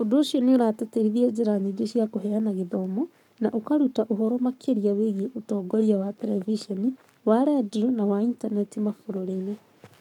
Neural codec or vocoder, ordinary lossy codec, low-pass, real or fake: codec, 44.1 kHz, 7.8 kbps, Pupu-Codec; none; 19.8 kHz; fake